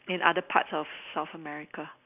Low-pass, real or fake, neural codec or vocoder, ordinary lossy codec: 3.6 kHz; real; none; none